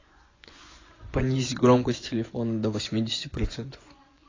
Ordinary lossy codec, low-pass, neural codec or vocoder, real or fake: AAC, 32 kbps; 7.2 kHz; vocoder, 24 kHz, 100 mel bands, Vocos; fake